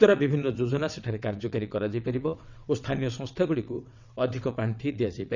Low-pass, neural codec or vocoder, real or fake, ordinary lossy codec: 7.2 kHz; vocoder, 22.05 kHz, 80 mel bands, WaveNeXt; fake; none